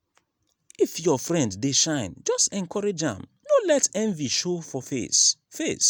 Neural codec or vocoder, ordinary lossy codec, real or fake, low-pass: none; none; real; none